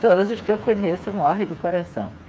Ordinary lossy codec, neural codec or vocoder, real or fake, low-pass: none; codec, 16 kHz, 4 kbps, FreqCodec, smaller model; fake; none